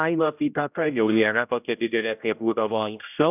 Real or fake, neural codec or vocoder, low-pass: fake; codec, 16 kHz, 0.5 kbps, X-Codec, HuBERT features, trained on general audio; 3.6 kHz